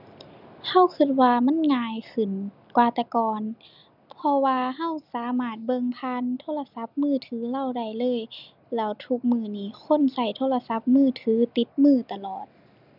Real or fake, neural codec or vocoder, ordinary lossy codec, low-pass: real; none; none; 5.4 kHz